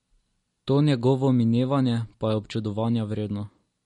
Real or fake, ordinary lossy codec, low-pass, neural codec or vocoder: real; MP3, 48 kbps; 19.8 kHz; none